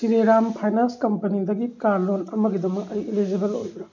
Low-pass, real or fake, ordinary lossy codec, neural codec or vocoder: 7.2 kHz; real; none; none